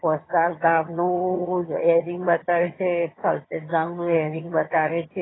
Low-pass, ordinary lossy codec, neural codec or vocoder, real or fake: 7.2 kHz; AAC, 16 kbps; vocoder, 22.05 kHz, 80 mel bands, HiFi-GAN; fake